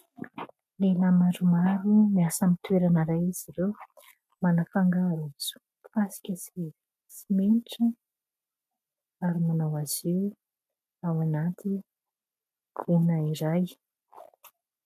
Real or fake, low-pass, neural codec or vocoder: real; 14.4 kHz; none